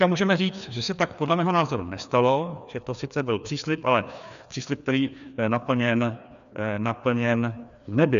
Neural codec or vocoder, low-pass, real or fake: codec, 16 kHz, 2 kbps, FreqCodec, larger model; 7.2 kHz; fake